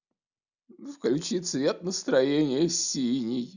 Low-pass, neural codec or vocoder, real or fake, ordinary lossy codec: 7.2 kHz; none; real; none